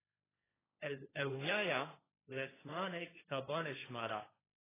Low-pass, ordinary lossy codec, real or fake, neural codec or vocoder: 3.6 kHz; AAC, 16 kbps; fake; codec, 16 kHz, 1.1 kbps, Voila-Tokenizer